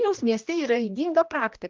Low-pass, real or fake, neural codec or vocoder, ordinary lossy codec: 7.2 kHz; fake; codec, 16 kHz, 1 kbps, X-Codec, HuBERT features, trained on general audio; Opus, 24 kbps